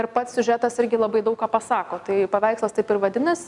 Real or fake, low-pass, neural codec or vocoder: real; 10.8 kHz; none